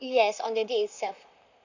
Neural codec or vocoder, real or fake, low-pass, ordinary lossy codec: vocoder, 44.1 kHz, 128 mel bands, Pupu-Vocoder; fake; 7.2 kHz; none